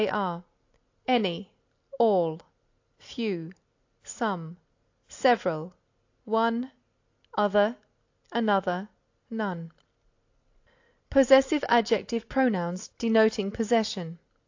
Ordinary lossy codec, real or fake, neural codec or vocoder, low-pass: AAC, 48 kbps; real; none; 7.2 kHz